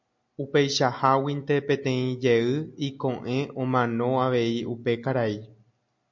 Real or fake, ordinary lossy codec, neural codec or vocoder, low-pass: real; MP3, 48 kbps; none; 7.2 kHz